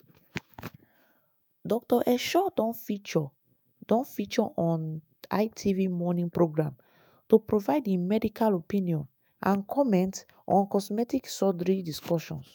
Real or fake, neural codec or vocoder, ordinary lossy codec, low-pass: fake; autoencoder, 48 kHz, 128 numbers a frame, DAC-VAE, trained on Japanese speech; none; none